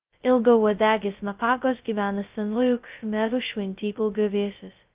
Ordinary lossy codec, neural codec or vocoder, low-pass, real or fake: Opus, 32 kbps; codec, 16 kHz, 0.2 kbps, FocalCodec; 3.6 kHz; fake